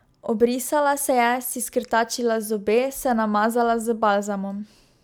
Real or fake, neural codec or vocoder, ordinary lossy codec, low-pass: real; none; none; none